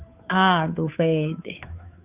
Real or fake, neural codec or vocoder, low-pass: fake; codec, 16 kHz, 2 kbps, FunCodec, trained on Chinese and English, 25 frames a second; 3.6 kHz